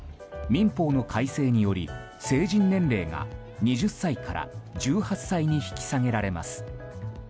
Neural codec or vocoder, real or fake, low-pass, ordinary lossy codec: none; real; none; none